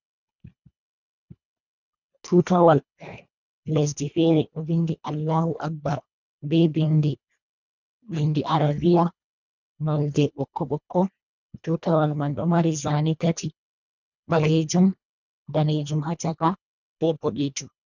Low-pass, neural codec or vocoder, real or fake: 7.2 kHz; codec, 24 kHz, 1.5 kbps, HILCodec; fake